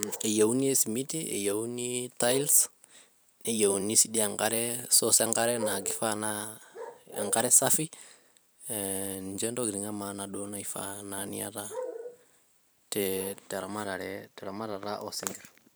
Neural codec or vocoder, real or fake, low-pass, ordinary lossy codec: vocoder, 44.1 kHz, 128 mel bands every 512 samples, BigVGAN v2; fake; none; none